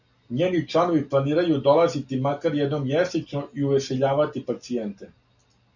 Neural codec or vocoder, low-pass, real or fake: none; 7.2 kHz; real